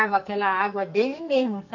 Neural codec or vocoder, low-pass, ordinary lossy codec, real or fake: codec, 44.1 kHz, 2.6 kbps, SNAC; 7.2 kHz; none; fake